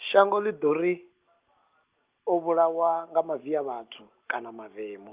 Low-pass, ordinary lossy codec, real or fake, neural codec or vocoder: 3.6 kHz; Opus, 24 kbps; real; none